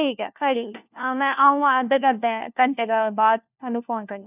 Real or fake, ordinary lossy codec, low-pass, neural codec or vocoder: fake; none; 3.6 kHz; codec, 16 kHz, 1 kbps, FunCodec, trained on LibriTTS, 50 frames a second